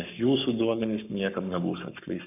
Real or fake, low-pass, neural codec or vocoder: fake; 3.6 kHz; codec, 44.1 kHz, 7.8 kbps, Pupu-Codec